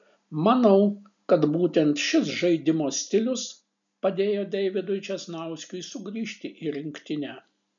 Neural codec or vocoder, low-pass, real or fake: none; 7.2 kHz; real